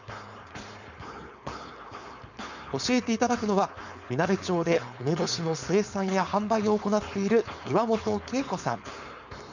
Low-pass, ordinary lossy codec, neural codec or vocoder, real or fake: 7.2 kHz; none; codec, 16 kHz, 4.8 kbps, FACodec; fake